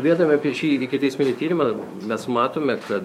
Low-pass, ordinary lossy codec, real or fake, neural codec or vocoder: 19.8 kHz; MP3, 64 kbps; fake; codec, 44.1 kHz, 7.8 kbps, DAC